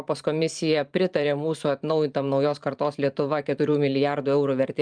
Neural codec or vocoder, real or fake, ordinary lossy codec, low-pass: vocoder, 44.1 kHz, 128 mel bands every 512 samples, BigVGAN v2; fake; Opus, 32 kbps; 9.9 kHz